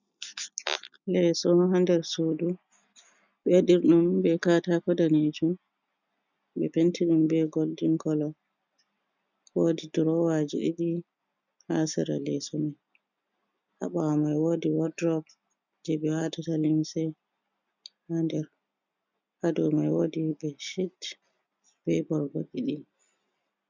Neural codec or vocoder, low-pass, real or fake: none; 7.2 kHz; real